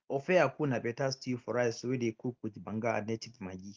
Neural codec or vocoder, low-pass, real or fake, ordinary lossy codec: none; 7.2 kHz; real; Opus, 32 kbps